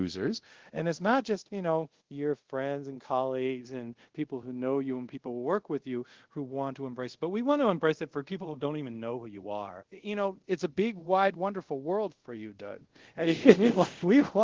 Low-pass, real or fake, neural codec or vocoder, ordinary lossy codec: 7.2 kHz; fake; codec, 24 kHz, 0.5 kbps, DualCodec; Opus, 16 kbps